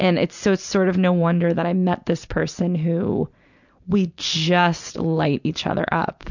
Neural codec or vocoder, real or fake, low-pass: none; real; 7.2 kHz